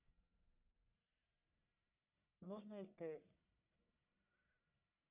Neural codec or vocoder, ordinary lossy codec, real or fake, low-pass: codec, 44.1 kHz, 1.7 kbps, Pupu-Codec; none; fake; 3.6 kHz